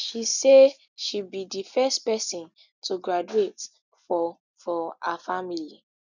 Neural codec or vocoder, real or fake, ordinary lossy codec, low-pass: none; real; none; 7.2 kHz